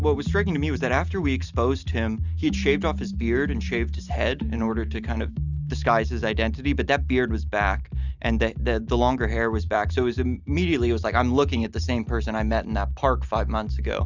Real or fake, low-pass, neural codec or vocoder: real; 7.2 kHz; none